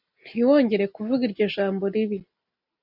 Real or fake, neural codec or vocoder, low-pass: real; none; 5.4 kHz